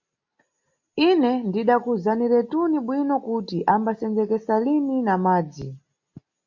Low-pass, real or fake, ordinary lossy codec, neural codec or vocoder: 7.2 kHz; real; MP3, 48 kbps; none